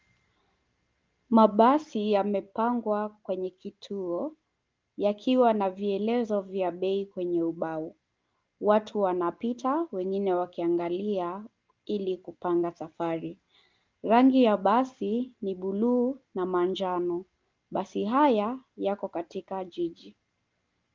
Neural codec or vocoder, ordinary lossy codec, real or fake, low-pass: none; Opus, 24 kbps; real; 7.2 kHz